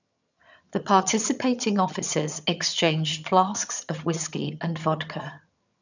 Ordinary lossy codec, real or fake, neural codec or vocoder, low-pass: none; fake; vocoder, 22.05 kHz, 80 mel bands, HiFi-GAN; 7.2 kHz